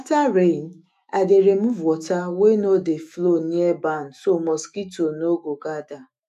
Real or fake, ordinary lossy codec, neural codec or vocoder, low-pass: fake; none; autoencoder, 48 kHz, 128 numbers a frame, DAC-VAE, trained on Japanese speech; 14.4 kHz